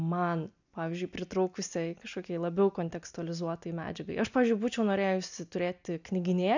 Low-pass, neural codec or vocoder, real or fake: 7.2 kHz; none; real